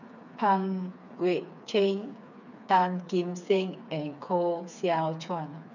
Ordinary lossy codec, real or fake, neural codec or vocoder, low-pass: none; fake; codec, 16 kHz, 4 kbps, FreqCodec, smaller model; 7.2 kHz